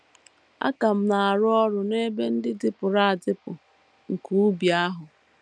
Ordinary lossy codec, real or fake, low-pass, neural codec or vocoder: none; real; none; none